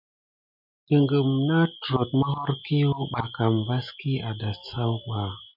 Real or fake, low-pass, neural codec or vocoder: real; 5.4 kHz; none